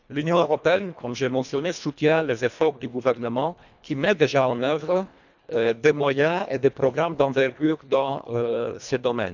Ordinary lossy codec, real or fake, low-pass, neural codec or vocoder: none; fake; 7.2 kHz; codec, 24 kHz, 1.5 kbps, HILCodec